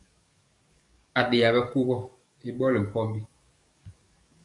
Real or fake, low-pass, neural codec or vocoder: fake; 10.8 kHz; codec, 44.1 kHz, 7.8 kbps, DAC